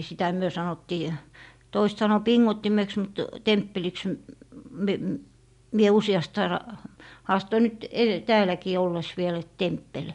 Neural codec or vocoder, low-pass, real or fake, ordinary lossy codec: none; 10.8 kHz; real; MP3, 64 kbps